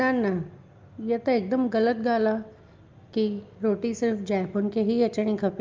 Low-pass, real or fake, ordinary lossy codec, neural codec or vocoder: 7.2 kHz; real; Opus, 24 kbps; none